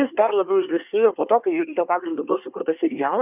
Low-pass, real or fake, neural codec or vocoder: 3.6 kHz; fake; codec, 24 kHz, 1 kbps, SNAC